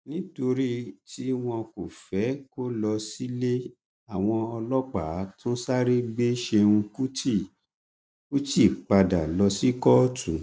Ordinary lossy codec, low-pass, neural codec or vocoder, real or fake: none; none; none; real